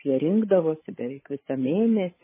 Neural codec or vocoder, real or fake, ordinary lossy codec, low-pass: codec, 16 kHz, 16 kbps, FreqCodec, larger model; fake; MP3, 16 kbps; 3.6 kHz